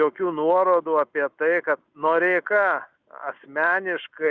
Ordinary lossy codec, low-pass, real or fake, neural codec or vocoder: Opus, 64 kbps; 7.2 kHz; real; none